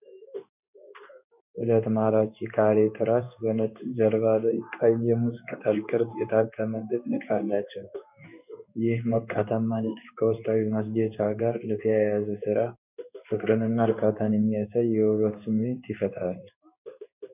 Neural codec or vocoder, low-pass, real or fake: codec, 16 kHz in and 24 kHz out, 1 kbps, XY-Tokenizer; 3.6 kHz; fake